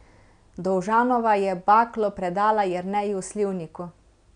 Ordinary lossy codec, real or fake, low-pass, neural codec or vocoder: none; real; 9.9 kHz; none